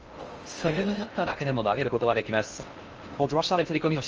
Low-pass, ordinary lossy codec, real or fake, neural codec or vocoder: 7.2 kHz; Opus, 24 kbps; fake; codec, 16 kHz in and 24 kHz out, 0.6 kbps, FocalCodec, streaming, 4096 codes